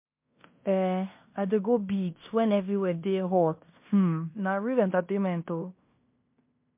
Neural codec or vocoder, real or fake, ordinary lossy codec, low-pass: codec, 16 kHz in and 24 kHz out, 0.9 kbps, LongCat-Audio-Codec, fine tuned four codebook decoder; fake; MP3, 32 kbps; 3.6 kHz